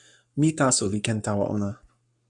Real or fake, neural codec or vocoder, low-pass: fake; codec, 44.1 kHz, 7.8 kbps, Pupu-Codec; 10.8 kHz